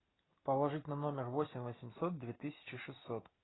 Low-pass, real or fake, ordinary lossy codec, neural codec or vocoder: 7.2 kHz; real; AAC, 16 kbps; none